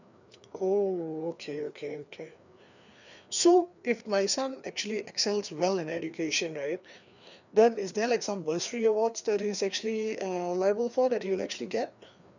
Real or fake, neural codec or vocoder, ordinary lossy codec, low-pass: fake; codec, 16 kHz, 2 kbps, FreqCodec, larger model; none; 7.2 kHz